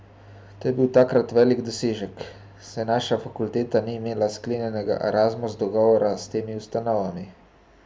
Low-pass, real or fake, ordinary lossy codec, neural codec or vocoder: none; real; none; none